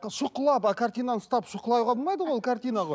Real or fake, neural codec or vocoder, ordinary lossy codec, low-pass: real; none; none; none